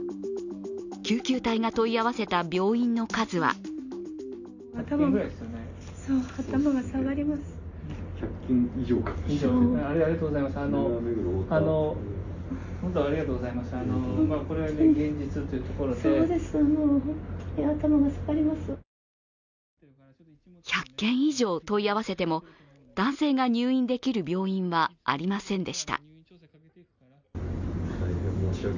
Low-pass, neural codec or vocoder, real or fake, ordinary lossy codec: 7.2 kHz; none; real; none